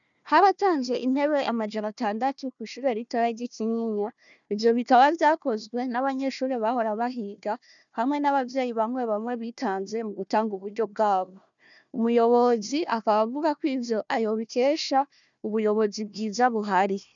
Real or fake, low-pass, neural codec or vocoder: fake; 7.2 kHz; codec, 16 kHz, 1 kbps, FunCodec, trained on Chinese and English, 50 frames a second